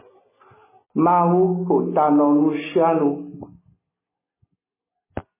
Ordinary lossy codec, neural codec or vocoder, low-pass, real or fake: MP3, 16 kbps; none; 3.6 kHz; real